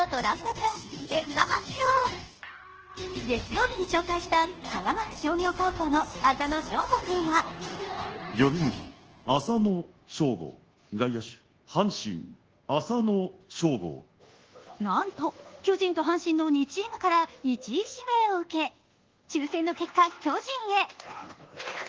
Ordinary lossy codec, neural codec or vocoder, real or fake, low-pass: Opus, 16 kbps; codec, 24 kHz, 1.2 kbps, DualCodec; fake; 7.2 kHz